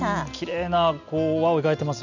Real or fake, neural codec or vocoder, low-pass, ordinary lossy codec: real; none; 7.2 kHz; none